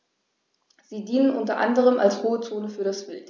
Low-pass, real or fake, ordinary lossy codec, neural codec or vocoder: none; real; none; none